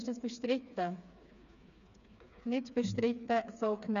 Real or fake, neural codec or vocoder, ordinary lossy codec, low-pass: fake; codec, 16 kHz, 4 kbps, FreqCodec, smaller model; none; 7.2 kHz